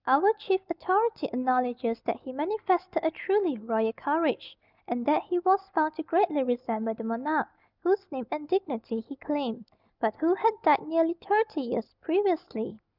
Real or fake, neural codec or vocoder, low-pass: real; none; 5.4 kHz